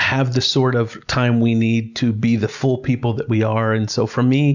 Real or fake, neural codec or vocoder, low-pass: real; none; 7.2 kHz